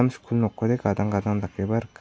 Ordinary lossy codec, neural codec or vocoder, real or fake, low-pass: none; none; real; none